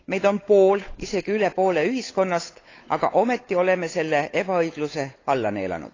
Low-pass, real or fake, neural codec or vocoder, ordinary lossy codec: 7.2 kHz; fake; codec, 16 kHz, 8 kbps, FunCodec, trained on Chinese and English, 25 frames a second; AAC, 32 kbps